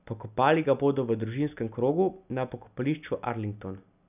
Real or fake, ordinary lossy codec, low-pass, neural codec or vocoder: real; none; 3.6 kHz; none